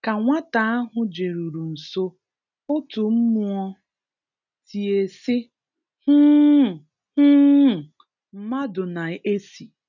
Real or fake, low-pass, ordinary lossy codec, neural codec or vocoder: real; 7.2 kHz; none; none